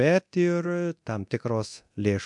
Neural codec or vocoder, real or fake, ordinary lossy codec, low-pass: codec, 24 kHz, 0.9 kbps, DualCodec; fake; MP3, 64 kbps; 10.8 kHz